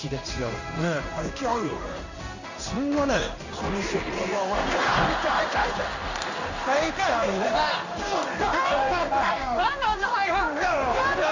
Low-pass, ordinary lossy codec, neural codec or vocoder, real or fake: 7.2 kHz; none; codec, 16 kHz in and 24 kHz out, 1 kbps, XY-Tokenizer; fake